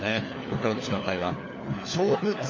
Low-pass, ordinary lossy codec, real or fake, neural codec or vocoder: 7.2 kHz; MP3, 32 kbps; fake; codec, 16 kHz, 4 kbps, FunCodec, trained on LibriTTS, 50 frames a second